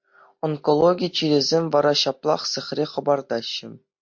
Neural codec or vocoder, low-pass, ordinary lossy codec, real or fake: none; 7.2 kHz; MP3, 48 kbps; real